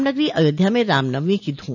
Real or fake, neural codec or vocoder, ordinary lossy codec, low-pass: real; none; none; 7.2 kHz